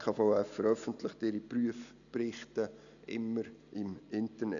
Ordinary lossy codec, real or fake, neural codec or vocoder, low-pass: none; real; none; 7.2 kHz